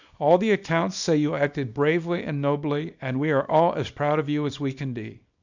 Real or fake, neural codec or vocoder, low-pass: fake; codec, 24 kHz, 0.9 kbps, WavTokenizer, small release; 7.2 kHz